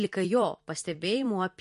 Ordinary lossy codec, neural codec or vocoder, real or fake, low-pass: MP3, 48 kbps; vocoder, 44.1 kHz, 128 mel bands every 256 samples, BigVGAN v2; fake; 14.4 kHz